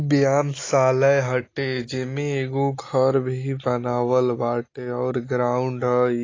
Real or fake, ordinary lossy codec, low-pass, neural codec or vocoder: real; AAC, 32 kbps; 7.2 kHz; none